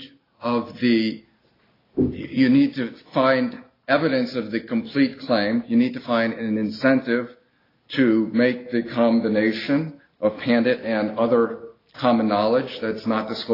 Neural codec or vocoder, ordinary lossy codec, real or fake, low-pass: none; AAC, 24 kbps; real; 5.4 kHz